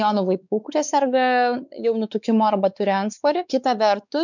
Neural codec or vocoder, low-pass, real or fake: codec, 16 kHz, 4 kbps, X-Codec, WavLM features, trained on Multilingual LibriSpeech; 7.2 kHz; fake